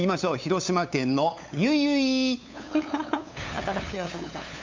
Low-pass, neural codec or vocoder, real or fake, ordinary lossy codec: 7.2 kHz; codec, 16 kHz, 8 kbps, FunCodec, trained on LibriTTS, 25 frames a second; fake; MP3, 64 kbps